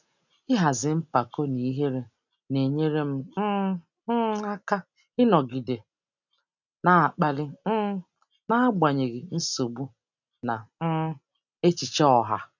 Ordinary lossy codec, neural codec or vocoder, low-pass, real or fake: none; none; 7.2 kHz; real